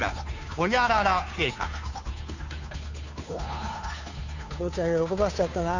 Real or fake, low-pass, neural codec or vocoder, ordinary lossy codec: fake; 7.2 kHz; codec, 16 kHz, 2 kbps, FunCodec, trained on Chinese and English, 25 frames a second; none